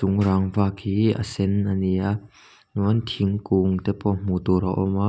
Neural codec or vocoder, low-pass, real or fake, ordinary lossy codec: none; none; real; none